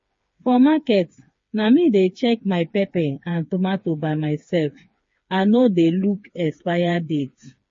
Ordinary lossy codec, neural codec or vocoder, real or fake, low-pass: MP3, 32 kbps; codec, 16 kHz, 4 kbps, FreqCodec, smaller model; fake; 7.2 kHz